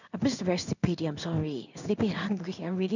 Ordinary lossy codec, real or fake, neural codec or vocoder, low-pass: none; fake; codec, 16 kHz in and 24 kHz out, 1 kbps, XY-Tokenizer; 7.2 kHz